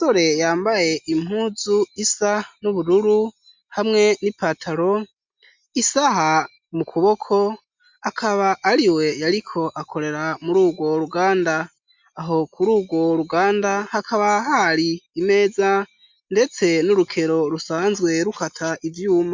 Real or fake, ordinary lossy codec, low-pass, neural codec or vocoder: real; MP3, 64 kbps; 7.2 kHz; none